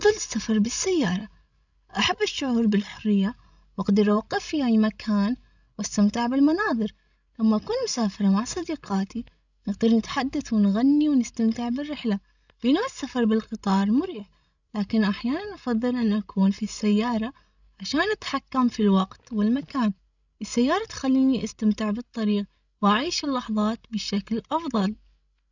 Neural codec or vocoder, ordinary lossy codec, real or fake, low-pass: codec, 16 kHz, 16 kbps, FreqCodec, larger model; none; fake; 7.2 kHz